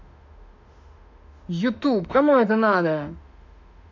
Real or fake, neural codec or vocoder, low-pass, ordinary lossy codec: fake; autoencoder, 48 kHz, 32 numbers a frame, DAC-VAE, trained on Japanese speech; 7.2 kHz; none